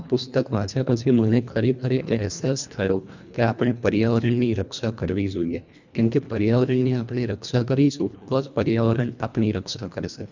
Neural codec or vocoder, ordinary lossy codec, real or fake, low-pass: codec, 24 kHz, 1.5 kbps, HILCodec; none; fake; 7.2 kHz